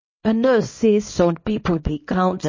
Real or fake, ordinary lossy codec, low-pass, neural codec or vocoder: fake; MP3, 32 kbps; 7.2 kHz; codec, 24 kHz, 0.9 kbps, WavTokenizer, small release